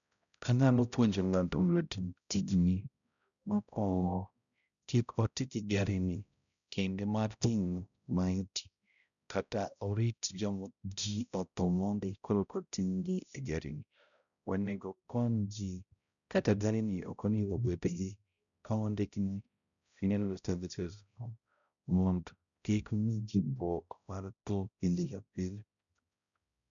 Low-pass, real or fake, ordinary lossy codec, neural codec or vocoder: 7.2 kHz; fake; none; codec, 16 kHz, 0.5 kbps, X-Codec, HuBERT features, trained on balanced general audio